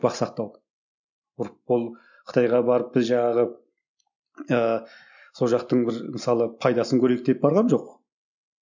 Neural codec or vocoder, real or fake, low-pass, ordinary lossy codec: none; real; 7.2 kHz; none